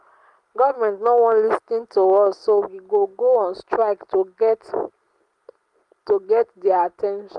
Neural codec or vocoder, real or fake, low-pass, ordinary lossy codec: none; real; 10.8 kHz; Opus, 32 kbps